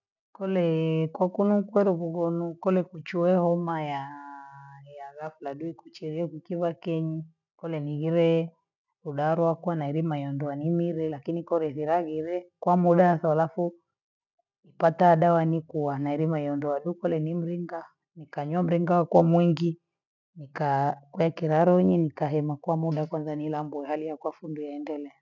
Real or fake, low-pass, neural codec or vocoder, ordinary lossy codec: real; 7.2 kHz; none; none